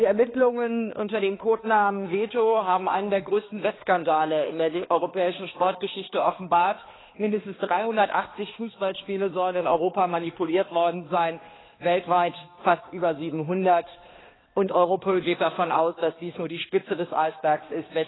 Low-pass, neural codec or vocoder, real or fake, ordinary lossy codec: 7.2 kHz; codec, 16 kHz, 2 kbps, X-Codec, HuBERT features, trained on balanced general audio; fake; AAC, 16 kbps